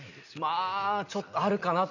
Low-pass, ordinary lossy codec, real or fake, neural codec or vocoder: 7.2 kHz; none; real; none